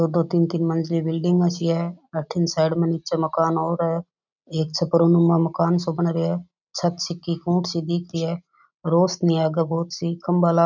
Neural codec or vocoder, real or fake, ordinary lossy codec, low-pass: none; real; none; 7.2 kHz